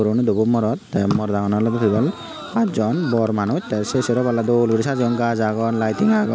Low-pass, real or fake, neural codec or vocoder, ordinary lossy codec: none; real; none; none